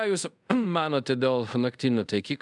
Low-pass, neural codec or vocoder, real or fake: 10.8 kHz; codec, 24 kHz, 0.5 kbps, DualCodec; fake